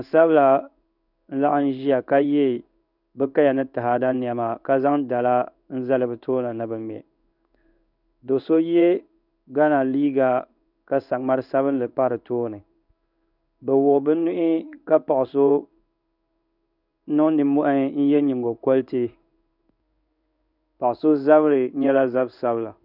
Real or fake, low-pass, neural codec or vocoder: fake; 5.4 kHz; codec, 16 kHz in and 24 kHz out, 1 kbps, XY-Tokenizer